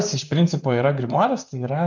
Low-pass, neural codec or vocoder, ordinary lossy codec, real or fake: 7.2 kHz; vocoder, 44.1 kHz, 128 mel bands, Pupu-Vocoder; MP3, 64 kbps; fake